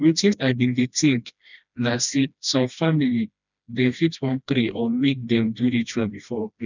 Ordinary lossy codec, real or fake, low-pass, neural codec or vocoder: none; fake; 7.2 kHz; codec, 16 kHz, 1 kbps, FreqCodec, smaller model